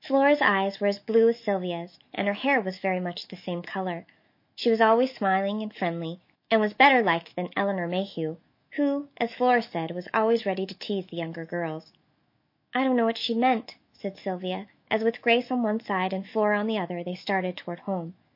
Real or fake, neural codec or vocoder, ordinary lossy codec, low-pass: fake; autoencoder, 48 kHz, 128 numbers a frame, DAC-VAE, trained on Japanese speech; MP3, 32 kbps; 5.4 kHz